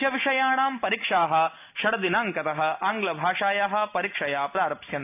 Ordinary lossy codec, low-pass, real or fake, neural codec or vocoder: AAC, 32 kbps; 3.6 kHz; real; none